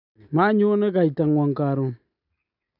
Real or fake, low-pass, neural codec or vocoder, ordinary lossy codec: real; 5.4 kHz; none; none